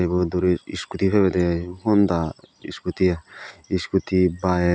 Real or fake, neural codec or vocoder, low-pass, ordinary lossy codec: real; none; none; none